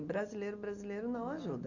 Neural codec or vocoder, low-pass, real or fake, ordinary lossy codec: none; 7.2 kHz; real; Opus, 64 kbps